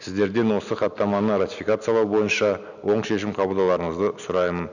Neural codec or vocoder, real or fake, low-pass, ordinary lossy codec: none; real; 7.2 kHz; none